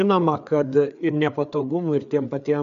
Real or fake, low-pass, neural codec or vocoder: fake; 7.2 kHz; codec, 16 kHz, 4 kbps, FreqCodec, larger model